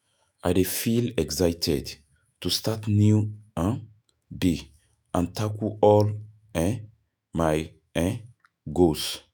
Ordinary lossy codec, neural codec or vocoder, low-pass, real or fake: none; autoencoder, 48 kHz, 128 numbers a frame, DAC-VAE, trained on Japanese speech; none; fake